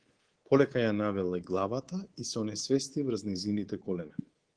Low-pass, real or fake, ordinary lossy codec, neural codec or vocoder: 9.9 kHz; fake; Opus, 16 kbps; codec, 24 kHz, 3.1 kbps, DualCodec